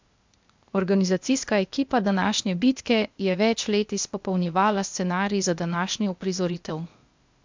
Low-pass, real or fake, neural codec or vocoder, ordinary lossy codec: 7.2 kHz; fake; codec, 16 kHz, 0.8 kbps, ZipCodec; MP3, 64 kbps